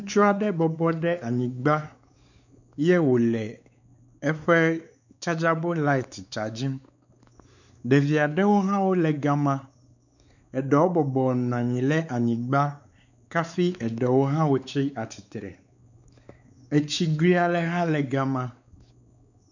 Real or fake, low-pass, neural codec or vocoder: fake; 7.2 kHz; codec, 16 kHz, 4 kbps, X-Codec, WavLM features, trained on Multilingual LibriSpeech